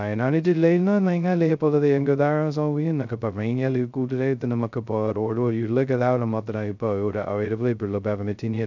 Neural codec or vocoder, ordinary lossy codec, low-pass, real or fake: codec, 16 kHz, 0.2 kbps, FocalCodec; none; 7.2 kHz; fake